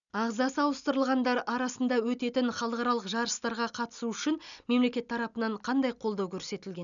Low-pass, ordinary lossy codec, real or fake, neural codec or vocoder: 7.2 kHz; none; real; none